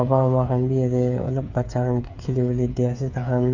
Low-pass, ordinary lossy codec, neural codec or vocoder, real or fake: 7.2 kHz; none; codec, 16 kHz, 8 kbps, FreqCodec, smaller model; fake